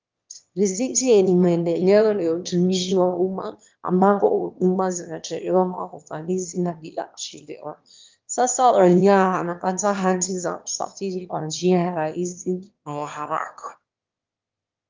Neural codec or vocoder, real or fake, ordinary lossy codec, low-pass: autoencoder, 22.05 kHz, a latent of 192 numbers a frame, VITS, trained on one speaker; fake; Opus, 32 kbps; 7.2 kHz